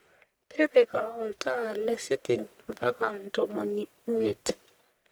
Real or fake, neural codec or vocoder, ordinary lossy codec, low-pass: fake; codec, 44.1 kHz, 1.7 kbps, Pupu-Codec; none; none